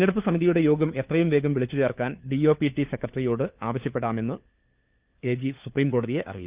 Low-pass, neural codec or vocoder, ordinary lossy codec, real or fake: 3.6 kHz; codec, 16 kHz, 4 kbps, FunCodec, trained on LibriTTS, 50 frames a second; Opus, 32 kbps; fake